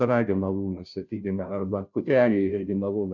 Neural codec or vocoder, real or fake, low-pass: codec, 16 kHz, 0.5 kbps, FunCodec, trained on Chinese and English, 25 frames a second; fake; 7.2 kHz